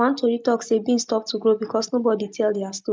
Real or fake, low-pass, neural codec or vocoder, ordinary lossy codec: real; none; none; none